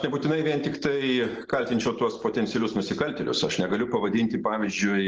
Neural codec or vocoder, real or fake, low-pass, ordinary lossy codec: none; real; 7.2 kHz; Opus, 16 kbps